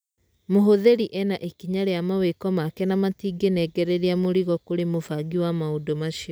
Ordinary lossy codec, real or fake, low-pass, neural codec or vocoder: none; real; none; none